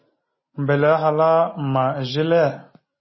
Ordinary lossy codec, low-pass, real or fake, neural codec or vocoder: MP3, 24 kbps; 7.2 kHz; real; none